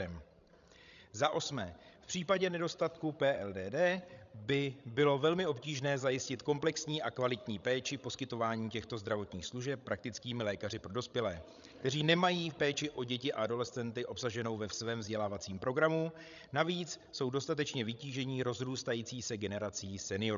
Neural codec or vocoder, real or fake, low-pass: codec, 16 kHz, 16 kbps, FreqCodec, larger model; fake; 7.2 kHz